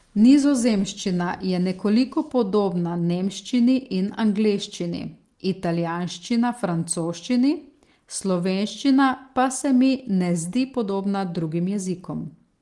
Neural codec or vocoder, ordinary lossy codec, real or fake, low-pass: none; Opus, 24 kbps; real; 10.8 kHz